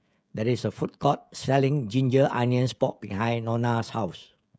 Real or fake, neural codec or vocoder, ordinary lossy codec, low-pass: fake; codec, 16 kHz, 16 kbps, FreqCodec, smaller model; none; none